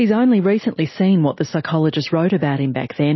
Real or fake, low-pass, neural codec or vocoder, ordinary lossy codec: real; 7.2 kHz; none; MP3, 24 kbps